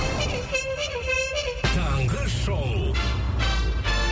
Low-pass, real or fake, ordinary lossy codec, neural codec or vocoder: none; real; none; none